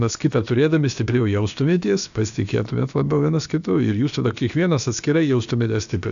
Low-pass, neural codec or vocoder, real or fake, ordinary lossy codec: 7.2 kHz; codec, 16 kHz, about 1 kbps, DyCAST, with the encoder's durations; fake; AAC, 96 kbps